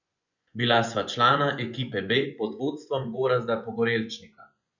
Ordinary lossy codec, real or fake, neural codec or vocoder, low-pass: none; fake; vocoder, 24 kHz, 100 mel bands, Vocos; 7.2 kHz